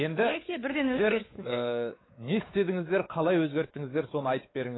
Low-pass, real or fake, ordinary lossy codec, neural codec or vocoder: 7.2 kHz; fake; AAC, 16 kbps; codec, 16 kHz, 4 kbps, X-Codec, WavLM features, trained on Multilingual LibriSpeech